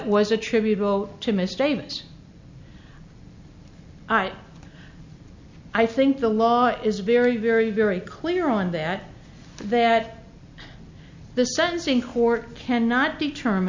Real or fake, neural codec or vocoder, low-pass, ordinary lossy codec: real; none; 7.2 kHz; MP3, 64 kbps